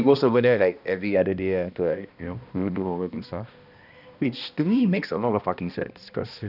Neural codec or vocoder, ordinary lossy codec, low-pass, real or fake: codec, 16 kHz, 1 kbps, X-Codec, HuBERT features, trained on balanced general audio; none; 5.4 kHz; fake